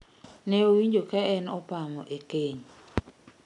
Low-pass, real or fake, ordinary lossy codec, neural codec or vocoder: 10.8 kHz; real; none; none